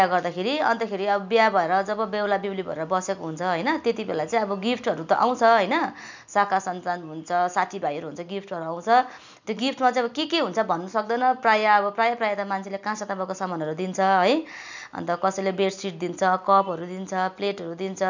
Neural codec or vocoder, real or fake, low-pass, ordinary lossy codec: none; real; 7.2 kHz; none